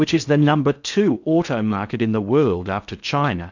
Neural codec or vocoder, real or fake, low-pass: codec, 16 kHz in and 24 kHz out, 0.6 kbps, FocalCodec, streaming, 4096 codes; fake; 7.2 kHz